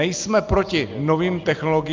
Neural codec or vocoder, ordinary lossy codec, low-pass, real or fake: none; Opus, 24 kbps; 7.2 kHz; real